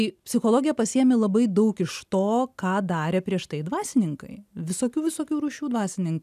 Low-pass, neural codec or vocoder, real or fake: 14.4 kHz; none; real